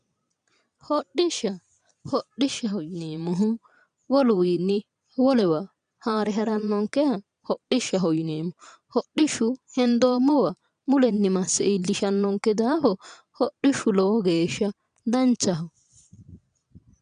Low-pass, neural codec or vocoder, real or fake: 9.9 kHz; vocoder, 22.05 kHz, 80 mel bands, Vocos; fake